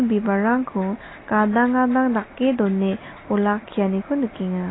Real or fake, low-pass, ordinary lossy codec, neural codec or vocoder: real; 7.2 kHz; AAC, 16 kbps; none